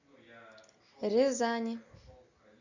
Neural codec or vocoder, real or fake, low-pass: none; real; 7.2 kHz